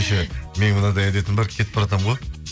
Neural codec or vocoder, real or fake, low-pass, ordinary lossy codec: none; real; none; none